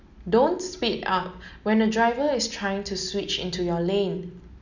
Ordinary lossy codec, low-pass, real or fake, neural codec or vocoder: none; 7.2 kHz; real; none